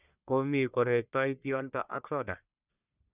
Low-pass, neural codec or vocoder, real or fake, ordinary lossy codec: 3.6 kHz; codec, 44.1 kHz, 1.7 kbps, Pupu-Codec; fake; none